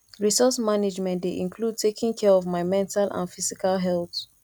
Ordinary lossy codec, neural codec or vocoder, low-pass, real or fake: none; none; 19.8 kHz; real